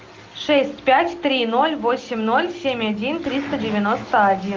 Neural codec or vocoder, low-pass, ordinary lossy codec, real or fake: none; 7.2 kHz; Opus, 32 kbps; real